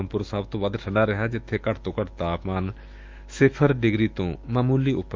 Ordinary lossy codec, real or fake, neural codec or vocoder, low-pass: Opus, 24 kbps; fake; autoencoder, 48 kHz, 128 numbers a frame, DAC-VAE, trained on Japanese speech; 7.2 kHz